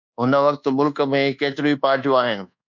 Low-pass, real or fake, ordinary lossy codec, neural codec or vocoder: 7.2 kHz; fake; MP3, 64 kbps; codec, 24 kHz, 1.2 kbps, DualCodec